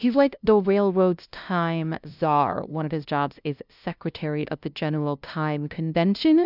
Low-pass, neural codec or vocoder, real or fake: 5.4 kHz; codec, 16 kHz, 0.5 kbps, FunCodec, trained on LibriTTS, 25 frames a second; fake